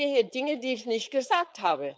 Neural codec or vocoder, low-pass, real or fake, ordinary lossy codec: codec, 16 kHz, 4.8 kbps, FACodec; none; fake; none